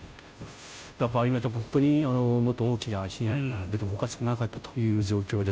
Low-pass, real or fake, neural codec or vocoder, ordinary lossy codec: none; fake; codec, 16 kHz, 0.5 kbps, FunCodec, trained on Chinese and English, 25 frames a second; none